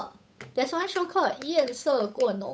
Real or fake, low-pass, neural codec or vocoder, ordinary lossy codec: fake; none; codec, 16 kHz, 8 kbps, FunCodec, trained on Chinese and English, 25 frames a second; none